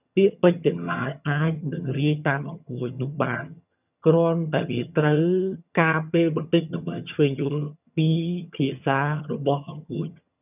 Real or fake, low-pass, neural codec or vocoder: fake; 3.6 kHz; vocoder, 22.05 kHz, 80 mel bands, HiFi-GAN